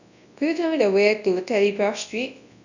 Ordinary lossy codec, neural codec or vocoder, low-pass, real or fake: none; codec, 24 kHz, 0.9 kbps, WavTokenizer, large speech release; 7.2 kHz; fake